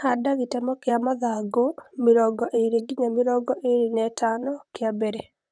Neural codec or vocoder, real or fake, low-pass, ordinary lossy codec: vocoder, 44.1 kHz, 128 mel bands, Pupu-Vocoder; fake; 9.9 kHz; none